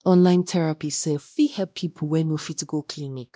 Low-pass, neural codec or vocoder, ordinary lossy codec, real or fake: none; codec, 16 kHz, 1 kbps, X-Codec, WavLM features, trained on Multilingual LibriSpeech; none; fake